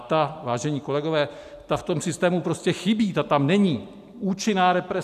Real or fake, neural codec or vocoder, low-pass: real; none; 14.4 kHz